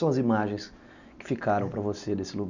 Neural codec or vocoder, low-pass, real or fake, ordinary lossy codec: none; 7.2 kHz; real; none